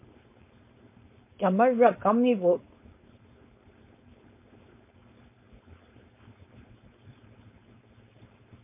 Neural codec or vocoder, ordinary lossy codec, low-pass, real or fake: codec, 16 kHz, 4.8 kbps, FACodec; MP3, 24 kbps; 3.6 kHz; fake